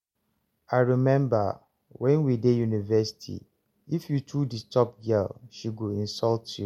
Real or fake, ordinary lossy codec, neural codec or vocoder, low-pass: real; MP3, 64 kbps; none; 19.8 kHz